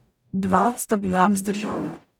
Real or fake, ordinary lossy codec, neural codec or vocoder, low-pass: fake; none; codec, 44.1 kHz, 0.9 kbps, DAC; 19.8 kHz